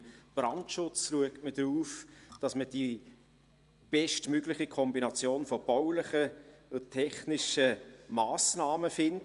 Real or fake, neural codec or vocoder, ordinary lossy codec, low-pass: fake; vocoder, 24 kHz, 100 mel bands, Vocos; none; 10.8 kHz